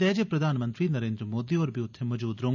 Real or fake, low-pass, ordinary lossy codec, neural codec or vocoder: real; 7.2 kHz; none; none